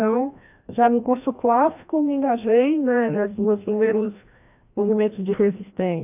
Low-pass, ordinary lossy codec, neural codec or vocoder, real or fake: 3.6 kHz; AAC, 32 kbps; codec, 16 kHz, 1 kbps, FreqCodec, larger model; fake